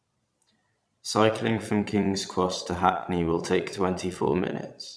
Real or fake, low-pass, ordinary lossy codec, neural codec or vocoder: fake; none; none; vocoder, 22.05 kHz, 80 mel bands, Vocos